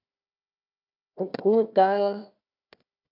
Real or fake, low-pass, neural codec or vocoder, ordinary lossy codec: fake; 5.4 kHz; codec, 16 kHz, 1 kbps, FunCodec, trained on Chinese and English, 50 frames a second; MP3, 48 kbps